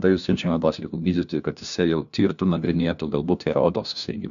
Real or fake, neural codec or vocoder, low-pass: fake; codec, 16 kHz, 1 kbps, FunCodec, trained on LibriTTS, 50 frames a second; 7.2 kHz